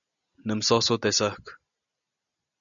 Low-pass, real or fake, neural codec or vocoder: 7.2 kHz; real; none